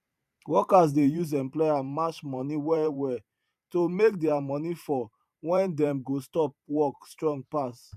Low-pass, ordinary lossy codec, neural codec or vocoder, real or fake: 14.4 kHz; none; vocoder, 44.1 kHz, 128 mel bands every 512 samples, BigVGAN v2; fake